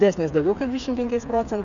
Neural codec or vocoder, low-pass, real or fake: codec, 16 kHz, 4 kbps, FreqCodec, smaller model; 7.2 kHz; fake